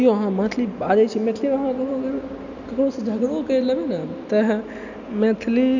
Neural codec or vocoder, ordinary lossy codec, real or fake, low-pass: none; none; real; 7.2 kHz